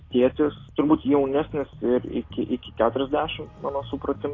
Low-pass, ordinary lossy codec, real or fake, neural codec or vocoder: 7.2 kHz; AAC, 32 kbps; real; none